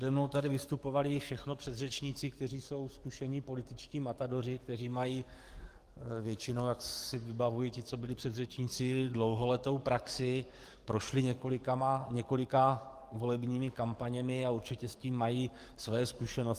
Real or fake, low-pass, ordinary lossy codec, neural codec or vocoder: fake; 14.4 kHz; Opus, 24 kbps; codec, 44.1 kHz, 7.8 kbps, DAC